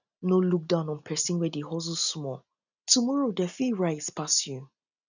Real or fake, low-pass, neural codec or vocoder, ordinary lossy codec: real; 7.2 kHz; none; none